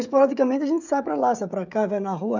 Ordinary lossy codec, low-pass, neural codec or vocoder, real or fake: none; 7.2 kHz; codec, 16 kHz, 16 kbps, FreqCodec, smaller model; fake